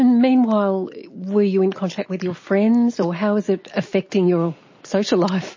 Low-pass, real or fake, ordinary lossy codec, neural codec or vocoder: 7.2 kHz; real; MP3, 32 kbps; none